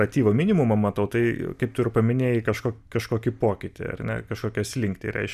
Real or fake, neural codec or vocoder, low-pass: real; none; 14.4 kHz